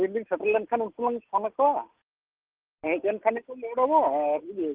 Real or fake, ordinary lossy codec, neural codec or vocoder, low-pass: real; Opus, 16 kbps; none; 3.6 kHz